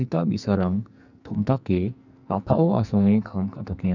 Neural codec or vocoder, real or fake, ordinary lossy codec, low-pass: codec, 44.1 kHz, 2.6 kbps, SNAC; fake; none; 7.2 kHz